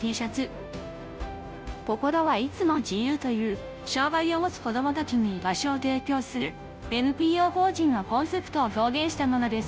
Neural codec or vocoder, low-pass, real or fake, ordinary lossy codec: codec, 16 kHz, 0.5 kbps, FunCodec, trained on Chinese and English, 25 frames a second; none; fake; none